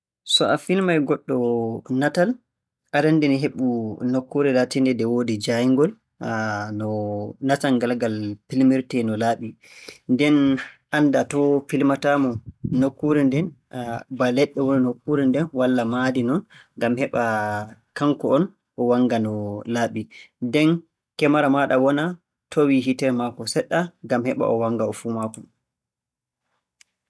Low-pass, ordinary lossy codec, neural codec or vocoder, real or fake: none; none; none; real